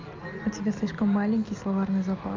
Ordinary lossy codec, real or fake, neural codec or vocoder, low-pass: Opus, 24 kbps; real; none; 7.2 kHz